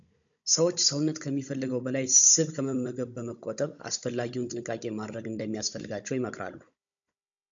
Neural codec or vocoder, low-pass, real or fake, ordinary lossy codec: codec, 16 kHz, 16 kbps, FunCodec, trained on Chinese and English, 50 frames a second; 7.2 kHz; fake; MP3, 64 kbps